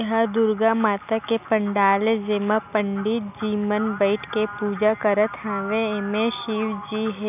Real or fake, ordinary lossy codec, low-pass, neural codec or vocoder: real; none; 3.6 kHz; none